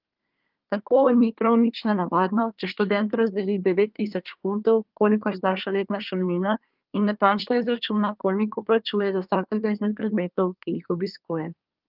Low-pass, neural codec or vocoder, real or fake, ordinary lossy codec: 5.4 kHz; codec, 24 kHz, 1 kbps, SNAC; fake; Opus, 24 kbps